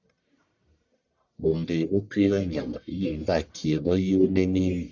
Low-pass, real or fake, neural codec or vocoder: 7.2 kHz; fake; codec, 44.1 kHz, 1.7 kbps, Pupu-Codec